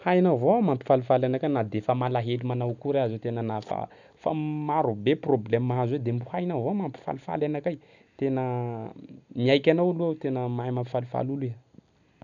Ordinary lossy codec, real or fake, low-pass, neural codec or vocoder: none; real; 7.2 kHz; none